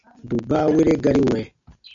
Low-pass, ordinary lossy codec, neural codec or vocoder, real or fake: 7.2 kHz; MP3, 96 kbps; none; real